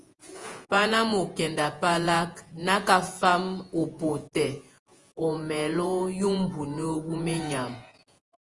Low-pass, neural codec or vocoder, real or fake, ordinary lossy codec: 10.8 kHz; vocoder, 48 kHz, 128 mel bands, Vocos; fake; Opus, 24 kbps